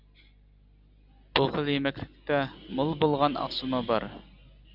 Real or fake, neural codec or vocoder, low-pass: real; none; 5.4 kHz